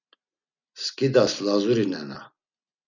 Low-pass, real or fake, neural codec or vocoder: 7.2 kHz; real; none